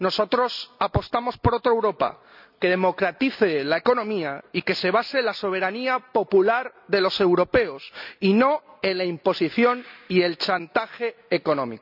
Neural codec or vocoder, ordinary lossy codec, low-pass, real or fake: none; none; 5.4 kHz; real